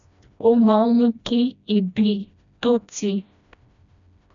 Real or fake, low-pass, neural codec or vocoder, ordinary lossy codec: fake; 7.2 kHz; codec, 16 kHz, 1 kbps, FreqCodec, smaller model; none